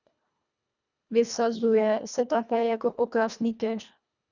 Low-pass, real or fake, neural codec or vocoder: 7.2 kHz; fake; codec, 24 kHz, 1.5 kbps, HILCodec